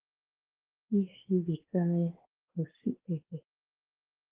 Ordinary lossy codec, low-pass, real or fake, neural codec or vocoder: Opus, 16 kbps; 3.6 kHz; fake; codec, 16 kHz, 2 kbps, X-Codec, WavLM features, trained on Multilingual LibriSpeech